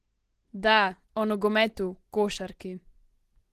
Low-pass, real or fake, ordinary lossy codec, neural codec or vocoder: 14.4 kHz; real; Opus, 16 kbps; none